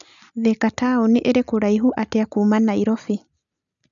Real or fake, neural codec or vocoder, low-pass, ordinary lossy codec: real; none; 7.2 kHz; none